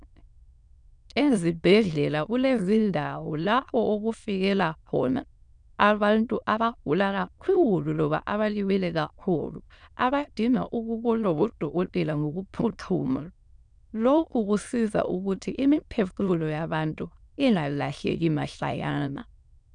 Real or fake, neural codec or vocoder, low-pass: fake; autoencoder, 22.05 kHz, a latent of 192 numbers a frame, VITS, trained on many speakers; 9.9 kHz